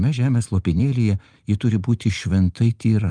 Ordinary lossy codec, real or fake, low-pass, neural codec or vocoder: Opus, 24 kbps; real; 9.9 kHz; none